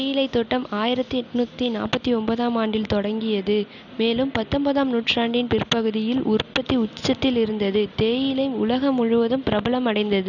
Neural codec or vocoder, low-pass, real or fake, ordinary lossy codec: none; 7.2 kHz; real; none